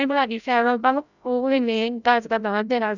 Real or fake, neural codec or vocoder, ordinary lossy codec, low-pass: fake; codec, 16 kHz, 0.5 kbps, FreqCodec, larger model; none; 7.2 kHz